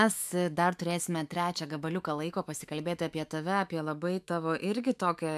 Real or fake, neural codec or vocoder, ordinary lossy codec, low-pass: fake; autoencoder, 48 kHz, 128 numbers a frame, DAC-VAE, trained on Japanese speech; AAC, 96 kbps; 14.4 kHz